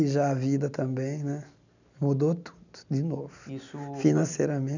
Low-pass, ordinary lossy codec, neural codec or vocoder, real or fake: 7.2 kHz; none; none; real